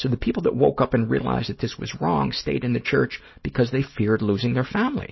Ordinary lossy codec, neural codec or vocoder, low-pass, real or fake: MP3, 24 kbps; none; 7.2 kHz; real